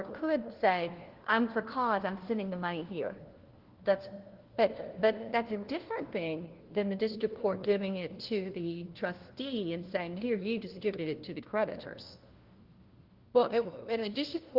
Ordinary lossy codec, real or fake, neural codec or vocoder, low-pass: Opus, 16 kbps; fake; codec, 16 kHz, 1 kbps, FunCodec, trained on LibriTTS, 50 frames a second; 5.4 kHz